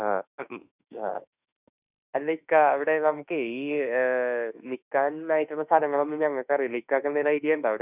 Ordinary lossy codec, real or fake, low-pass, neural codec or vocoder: none; fake; 3.6 kHz; autoencoder, 48 kHz, 32 numbers a frame, DAC-VAE, trained on Japanese speech